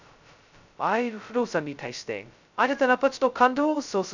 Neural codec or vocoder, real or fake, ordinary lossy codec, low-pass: codec, 16 kHz, 0.2 kbps, FocalCodec; fake; none; 7.2 kHz